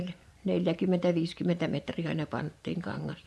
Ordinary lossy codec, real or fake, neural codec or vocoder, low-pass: none; fake; vocoder, 24 kHz, 100 mel bands, Vocos; none